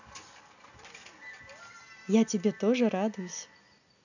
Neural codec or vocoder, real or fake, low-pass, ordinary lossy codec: none; real; 7.2 kHz; none